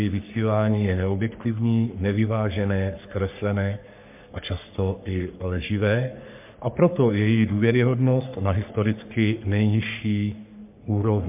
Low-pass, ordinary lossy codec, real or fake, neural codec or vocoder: 3.6 kHz; MP3, 32 kbps; fake; codec, 44.1 kHz, 3.4 kbps, Pupu-Codec